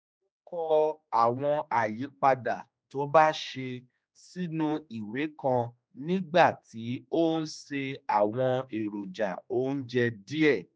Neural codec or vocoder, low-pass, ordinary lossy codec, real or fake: codec, 16 kHz, 2 kbps, X-Codec, HuBERT features, trained on general audio; none; none; fake